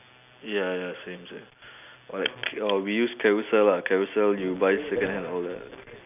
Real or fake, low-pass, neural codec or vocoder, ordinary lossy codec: real; 3.6 kHz; none; none